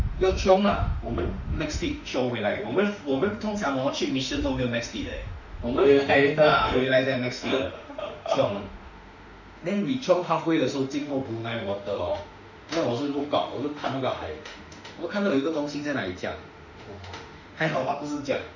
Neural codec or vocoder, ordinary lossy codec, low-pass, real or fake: autoencoder, 48 kHz, 32 numbers a frame, DAC-VAE, trained on Japanese speech; none; 7.2 kHz; fake